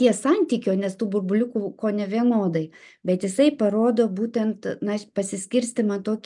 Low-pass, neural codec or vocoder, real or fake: 10.8 kHz; none; real